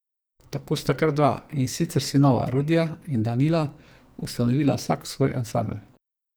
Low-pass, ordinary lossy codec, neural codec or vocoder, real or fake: none; none; codec, 44.1 kHz, 2.6 kbps, SNAC; fake